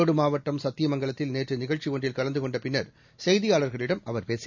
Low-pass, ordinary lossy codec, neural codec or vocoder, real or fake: 7.2 kHz; none; none; real